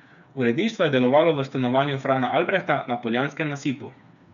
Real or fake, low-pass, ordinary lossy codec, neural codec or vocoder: fake; 7.2 kHz; none; codec, 16 kHz, 4 kbps, FreqCodec, smaller model